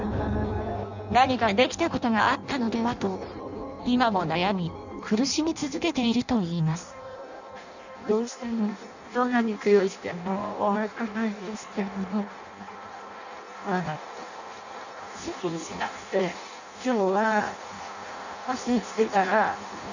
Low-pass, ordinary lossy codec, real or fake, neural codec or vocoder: 7.2 kHz; none; fake; codec, 16 kHz in and 24 kHz out, 0.6 kbps, FireRedTTS-2 codec